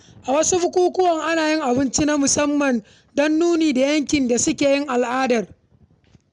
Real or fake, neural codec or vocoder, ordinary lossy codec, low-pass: real; none; none; 10.8 kHz